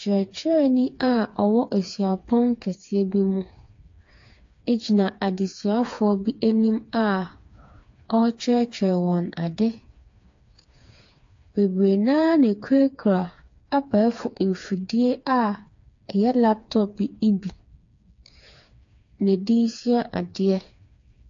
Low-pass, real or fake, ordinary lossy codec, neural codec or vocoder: 7.2 kHz; fake; AAC, 48 kbps; codec, 16 kHz, 4 kbps, FreqCodec, smaller model